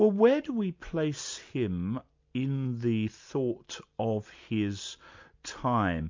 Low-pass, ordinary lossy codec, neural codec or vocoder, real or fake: 7.2 kHz; MP3, 64 kbps; none; real